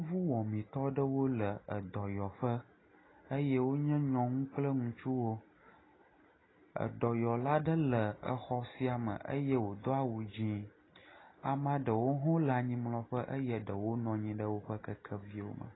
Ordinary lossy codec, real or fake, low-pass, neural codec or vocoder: AAC, 16 kbps; real; 7.2 kHz; none